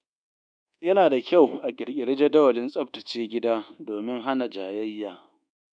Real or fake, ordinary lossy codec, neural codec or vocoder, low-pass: fake; none; codec, 24 kHz, 1.2 kbps, DualCodec; 9.9 kHz